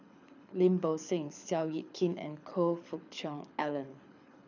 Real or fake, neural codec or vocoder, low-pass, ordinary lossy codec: fake; codec, 24 kHz, 6 kbps, HILCodec; 7.2 kHz; none